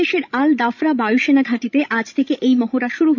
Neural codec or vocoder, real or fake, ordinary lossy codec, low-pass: codec, 16 kHz, 16 kbps, FreqCodec, larger model; fake; none; 7.2 kHz